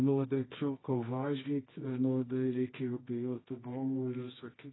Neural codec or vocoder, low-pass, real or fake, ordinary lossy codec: codec, 24 kHz, 0.9 kbps, WavTokenizer, medium music audio release; 7.2 kHz; fake; AAC, 16 kbps